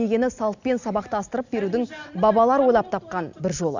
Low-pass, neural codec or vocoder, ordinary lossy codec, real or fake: 7.2 kHz; none; none; real